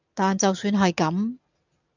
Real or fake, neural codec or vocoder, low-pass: real; none; 7.2 kHz